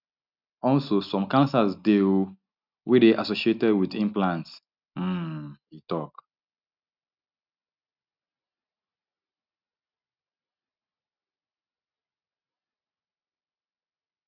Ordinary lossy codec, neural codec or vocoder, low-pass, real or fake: none; none; 5.4 kHz; real